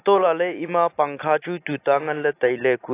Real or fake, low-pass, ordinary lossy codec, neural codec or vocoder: real; 3.6 kHz; AAC, 24 kbps; none